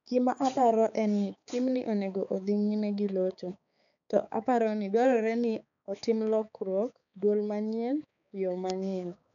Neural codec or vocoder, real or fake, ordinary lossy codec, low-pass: codec, 16 kHz, 4 kbps, X-Codec, HuBERT features, trained on balanced general audio; fake; none; 7.2 kHz